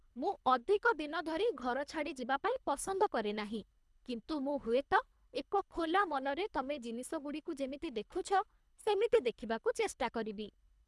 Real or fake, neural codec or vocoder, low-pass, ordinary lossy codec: fake; codec, 24 kHz, 3 kbps, HILCodec; none; none